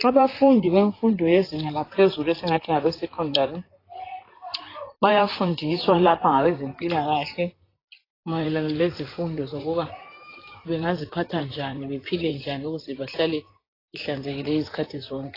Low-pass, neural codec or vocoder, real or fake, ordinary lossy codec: 5.4 kHz; vocoder, 44.1 kHz, 128 mel bands, Pupu-Vocoder; fake; AAC, 24 kbps